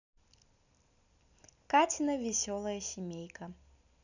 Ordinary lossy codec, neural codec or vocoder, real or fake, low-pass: none; none; real; 7.2 kHz